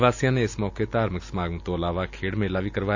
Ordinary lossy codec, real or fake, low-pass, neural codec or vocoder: AAC, 48 kbps; real; 7.2 kHz; none